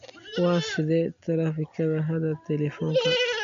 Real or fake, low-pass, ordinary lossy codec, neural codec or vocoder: real; 7.2 kHz; MP3, 48 kbps; none